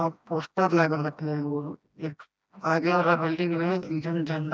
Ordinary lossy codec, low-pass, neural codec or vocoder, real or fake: none; none; codec, 16 kHz, 1 kbps, FreqCodec, smaller model; fake